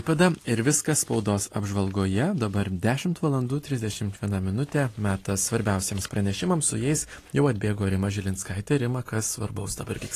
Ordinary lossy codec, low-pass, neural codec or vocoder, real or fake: AAC, 48 kbps; 14.4 kHz; none; real